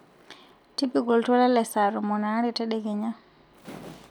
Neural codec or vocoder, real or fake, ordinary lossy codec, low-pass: none; real; none; 19.8 kHz